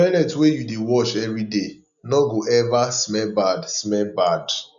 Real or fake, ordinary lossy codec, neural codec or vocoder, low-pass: real; none; none; 7.2 kHz